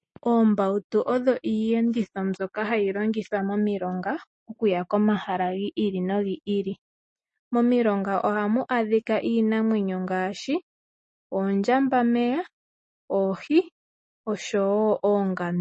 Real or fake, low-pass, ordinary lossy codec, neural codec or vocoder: real; 10.8 kHz; MP3, 32 kbps; none